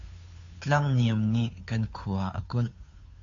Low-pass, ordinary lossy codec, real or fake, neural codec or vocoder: 7.2 kHz; AAC, 48 kbps; fake; codec, 16 kHz, 2 kbps, FunCodec, trained on Chinese and English, 25 frames a second